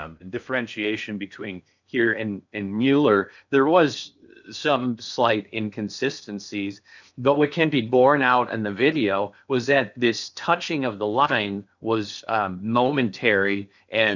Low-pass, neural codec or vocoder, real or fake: 7.2 kHz; codec, 16 kHz in and 24 kHz out, 0.8 kbps, FocalCodec, streaming, 65536 codes; fake